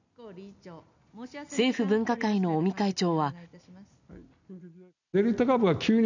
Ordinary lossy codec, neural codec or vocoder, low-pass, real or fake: none; none; 7.2 kHz; real